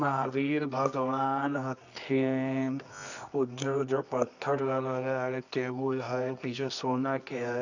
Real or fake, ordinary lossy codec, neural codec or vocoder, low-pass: fake; none; codec, 24 kHz, 0.9 kbps, WavTokenizer, medium music audio release; 7.2 kHz